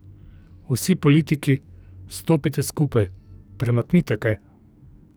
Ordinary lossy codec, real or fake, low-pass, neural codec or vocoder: none; fake; none; codec, 44.1 kHz, 2.6 kbps, SNAC